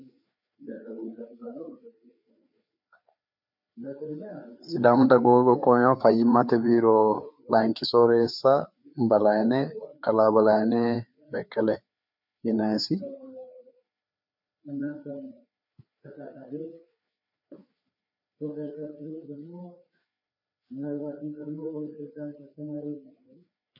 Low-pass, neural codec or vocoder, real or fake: 5.4 kHz; codec, 16 kHz, 4 kbps, FreqCodec, larger model; fake